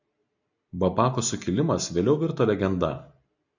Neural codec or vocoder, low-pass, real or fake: none; 7.2 kHz; real